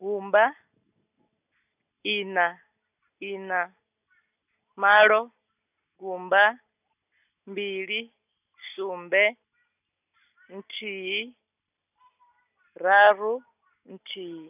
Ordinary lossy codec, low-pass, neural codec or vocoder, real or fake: none; 3.6 kHz; none; real